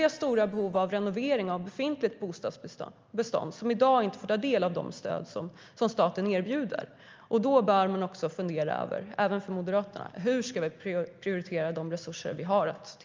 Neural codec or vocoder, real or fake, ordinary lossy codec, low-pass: none; real; Opus, 24 kbps; 7.2 kHz